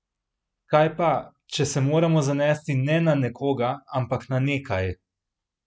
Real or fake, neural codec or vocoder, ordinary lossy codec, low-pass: real; none; none; none